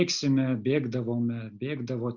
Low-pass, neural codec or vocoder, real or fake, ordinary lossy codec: 7.2 kHz; none; real; Opus, 64 kbps